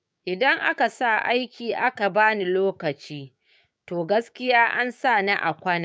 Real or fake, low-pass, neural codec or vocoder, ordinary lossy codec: fake; none; codec, 16 kHz, 6 kbps, DAC; none